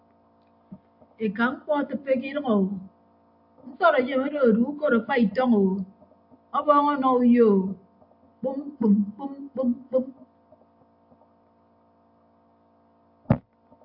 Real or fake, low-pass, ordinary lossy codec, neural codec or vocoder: real; 5.4 kHz; AAC, 48 kbps; none